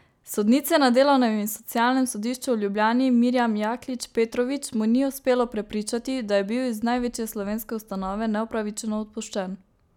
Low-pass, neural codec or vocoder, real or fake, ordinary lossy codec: 19.8 kHz; none; real; none